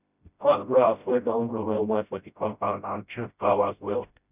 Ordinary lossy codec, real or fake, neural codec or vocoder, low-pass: none; fake; codec, 16 kHz, 0.5 kbps, FreqCodec, smaller model; 3.6 kHz